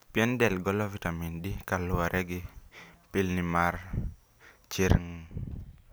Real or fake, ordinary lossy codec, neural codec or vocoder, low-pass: real; none; none; none